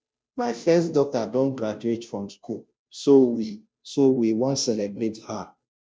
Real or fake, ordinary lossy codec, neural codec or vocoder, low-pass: fake; none; codec, 16 kHz, 0.5 kbps, FunCodec, trained on Chinese and English, 25 frames a second; none